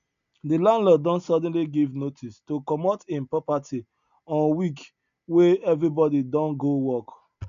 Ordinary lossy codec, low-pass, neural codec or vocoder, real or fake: none; 7.2 kHz; none; real